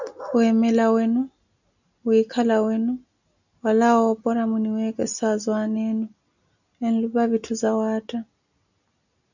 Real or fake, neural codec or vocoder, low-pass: real; none; 7.2 kHz